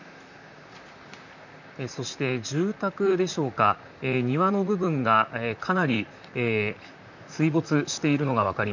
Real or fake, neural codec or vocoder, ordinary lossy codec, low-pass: fake; vocoder, 44.1 kHz, 128 mel bands, Pupu-Vocoder; none; 7.2 kHz